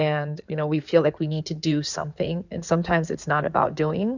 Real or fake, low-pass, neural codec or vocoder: fake; 7.2 kHz; codec, 16 kHz in and 24 kHz out, 2.2 kbps, FireRedTTS-2 codec